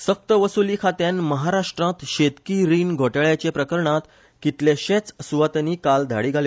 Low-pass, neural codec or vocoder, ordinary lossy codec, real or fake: none; none; none; real